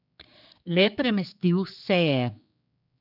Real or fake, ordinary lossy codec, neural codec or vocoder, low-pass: fake; none; codec, 16 kHz, 4 kbps, X-Codec, HuBERT features, trained on general audio; 5.4 kHz